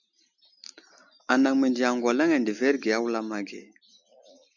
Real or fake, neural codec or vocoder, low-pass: real; none; 7.2 kHz